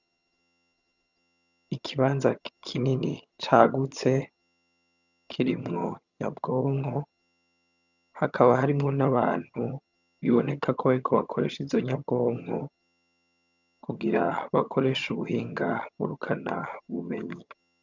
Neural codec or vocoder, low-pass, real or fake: vocoder, 22.05 kHz, 80 mel bands, HiFi-GAN; 7.2 kHz; fake